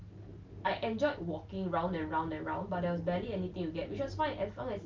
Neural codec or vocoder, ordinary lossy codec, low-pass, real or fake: none; Opus, 24 kbps; 7.2 kHz; real